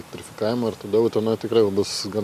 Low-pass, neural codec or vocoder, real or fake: 14.4 kHz; none; real